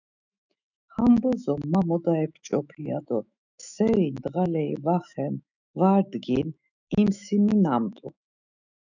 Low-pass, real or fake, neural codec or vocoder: 7.2 kHz; fake; autoencoder, 48 kHz, 128 numbers a frame, DAC-VAE, trained on Japanese speech